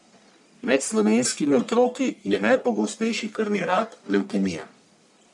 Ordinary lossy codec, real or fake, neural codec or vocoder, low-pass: none; fake; codec, 44.1 kHz, 1.7 kbps, Pupu-Codec; 10.8 kHz